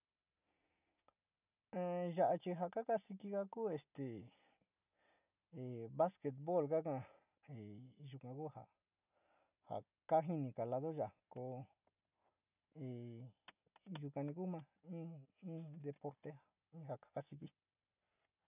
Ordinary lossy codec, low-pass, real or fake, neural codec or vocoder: none; 3.6 kHz; real; none